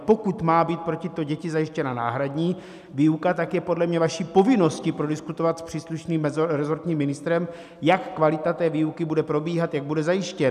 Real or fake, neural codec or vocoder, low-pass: real; none; 14.4 kHz